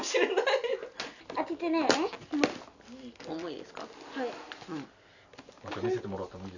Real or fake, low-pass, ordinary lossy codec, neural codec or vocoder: real; 7.2 kHz; none; none